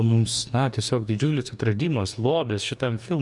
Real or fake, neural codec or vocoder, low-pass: fake; codec, 44.1 kHz, 2.6 kbps, DAC; 10.8 kHz